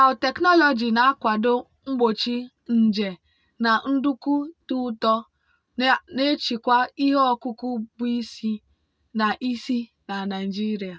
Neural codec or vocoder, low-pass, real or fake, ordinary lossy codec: none; none; real; none